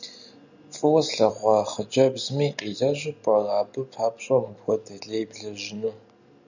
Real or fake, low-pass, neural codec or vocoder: real; 7.2 kHz; none